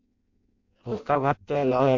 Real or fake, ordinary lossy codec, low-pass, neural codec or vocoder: fake; MP3, 48 kbps; 7.2 kHz; codec, 16 kHz in and 24 kHz out, 0.6 kbps, FireRedTTS-2 codec